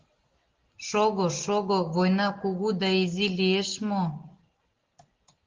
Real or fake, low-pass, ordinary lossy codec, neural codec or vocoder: real; 7.2 kHz; Opus, 16 kbps; none